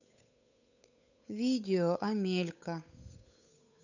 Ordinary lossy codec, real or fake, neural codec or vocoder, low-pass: none; fake; codec, 16 kHz, 8 kbps, FunCodec, trained on Chinese and English, 25 frames a second; 7.2 kHz